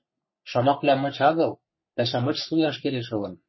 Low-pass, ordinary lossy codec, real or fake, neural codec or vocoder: 7.2 kHz; MP3, 24 kbps; fake; codec, 44.1 kHz, 3.4 kbps, Pupu-Codec